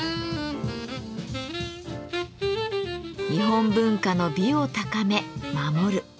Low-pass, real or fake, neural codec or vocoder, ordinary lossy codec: none; real; none; none